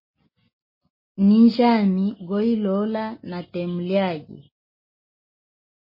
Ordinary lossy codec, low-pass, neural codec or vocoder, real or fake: MP3, 24 kbps; 5.4 kHz; none; real